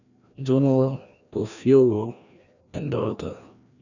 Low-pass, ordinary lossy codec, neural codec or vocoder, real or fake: 7.2 kHz; none; codec, 16 kHz, 1 kbps, FreqCodec, larger model; fake